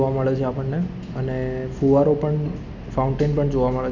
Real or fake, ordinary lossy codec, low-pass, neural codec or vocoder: real; none; 7.2 kHz; none